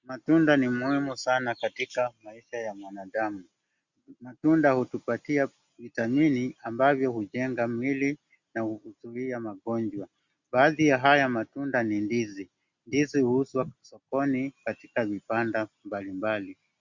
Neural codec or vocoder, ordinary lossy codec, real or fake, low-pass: none; Opus, 64 kbps; real; 7.2 kHz